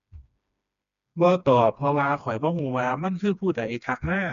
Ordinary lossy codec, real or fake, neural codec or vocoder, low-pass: none; fake; codec, 16 kHz, 2 kbps, FreqCodec, smaller model; 7.2 kHz